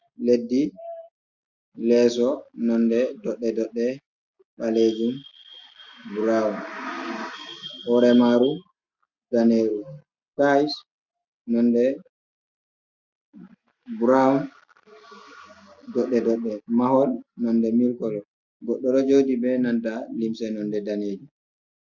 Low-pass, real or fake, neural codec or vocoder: 7.2 kHz; real; none